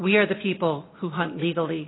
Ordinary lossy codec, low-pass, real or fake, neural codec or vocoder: AAC, 16 kbps; 7.2 kHz; real; none